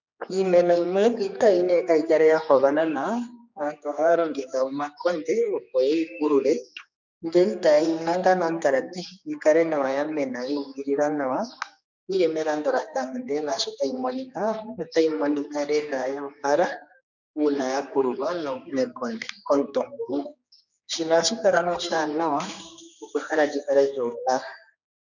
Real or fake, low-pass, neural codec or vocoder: fake; 7.2 kHz; codec, 16 kHz, 2 kbps, X-Codec, HuBERT features, trained on general audio